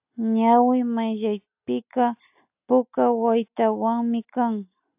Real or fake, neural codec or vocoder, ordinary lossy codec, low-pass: real; none; AAC, 32 kbps; 3.6 kHz